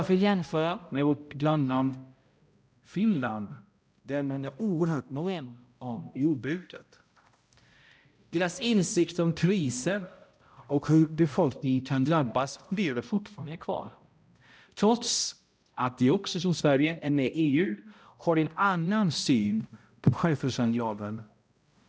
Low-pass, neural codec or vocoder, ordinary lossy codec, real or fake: none; codec, 16 kHz, 0.5 kbps, X-Codec, HuBERT features, trained on balanced general audio; none; fake